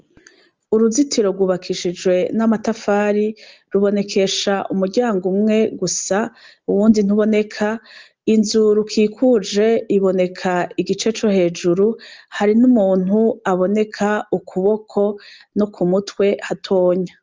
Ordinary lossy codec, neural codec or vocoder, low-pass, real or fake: Opus, 24 kbps; none; 7.2 kHz; real